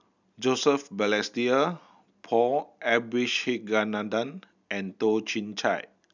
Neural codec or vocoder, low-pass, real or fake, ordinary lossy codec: none; 7.2 kHz; real; none